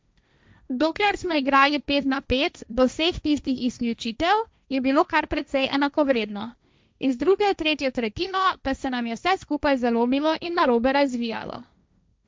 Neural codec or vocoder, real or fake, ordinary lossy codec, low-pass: codec, 16 kHz, 1.1 kbps, Voila-Tokenizer; fake; none; none